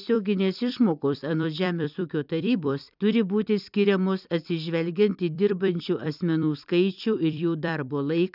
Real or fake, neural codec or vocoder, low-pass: fake; vocoder, 44.1 kHz, 128 mel bands every 256 samples, BigVGAN v2; 5.4 kHz